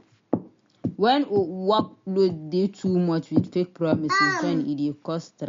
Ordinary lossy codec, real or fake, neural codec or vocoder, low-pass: MP3, 48 kbps; real; none; 7.2 kHz